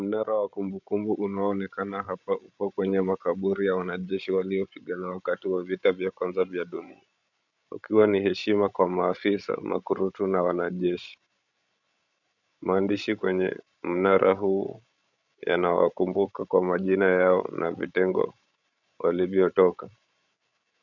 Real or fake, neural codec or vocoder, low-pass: fake; codec, 16 kHz, 16 kbps, FreqCodec, larger model; 7.2 kHz